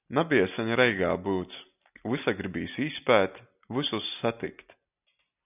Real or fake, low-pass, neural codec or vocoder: real; 3.6 kHz; none